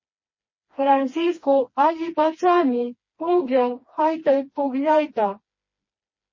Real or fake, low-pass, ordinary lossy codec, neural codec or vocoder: fake; 7.2 kHz; MP3, 32 kbps; codec, 16 kHz, 2 kbps, FreqCodec, smaller model